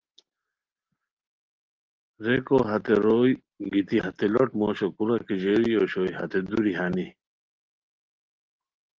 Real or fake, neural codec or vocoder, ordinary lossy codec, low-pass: real; none; Opus, 16 kbps; 7.2 kHz